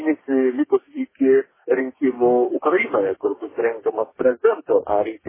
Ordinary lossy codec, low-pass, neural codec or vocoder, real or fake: MP3, 16 kbps; 3.6 kHz; codec, 44.1 kHz, 2.6 kbps, DAC; fake